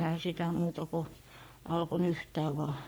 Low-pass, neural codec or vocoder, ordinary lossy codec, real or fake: none; codec, 44.1 kHz, 3.4 kbps, Pupu-Codec; none; fake